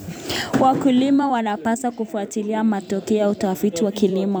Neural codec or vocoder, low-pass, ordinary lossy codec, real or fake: vocoder, 44.1 kHz, 128 mel bands every 256 samples, BigVGAN v2; none; none; fake